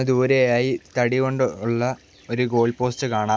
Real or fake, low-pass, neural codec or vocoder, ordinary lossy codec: real; none; none; none